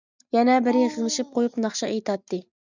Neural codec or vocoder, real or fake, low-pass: none; real; 7.2 kHz